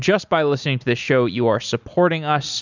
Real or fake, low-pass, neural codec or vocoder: real; 7.2 kHz; none